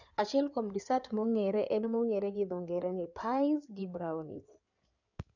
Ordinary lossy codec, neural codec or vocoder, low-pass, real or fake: none; codec, 16 kHz in and 24 kHz out, 2.2 kbps, FireRedTTS-2 codec; 7.2 kHz; fake